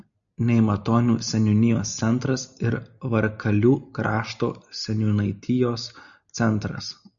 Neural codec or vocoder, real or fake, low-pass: none; real; 7.2 kHz